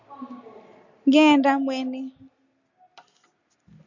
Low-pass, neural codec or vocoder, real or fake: 7.2 kHz; none; real